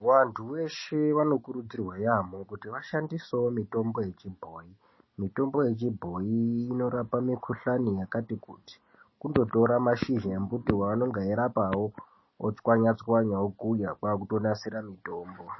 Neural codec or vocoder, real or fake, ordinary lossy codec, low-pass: none; real; MP3, 24 kbps; 7.2 kHz